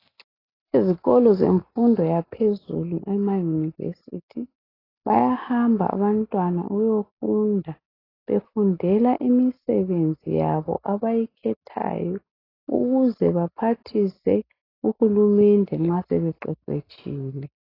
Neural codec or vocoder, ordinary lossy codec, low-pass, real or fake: none; AAC, 24 kbps; 5.4 kHz; real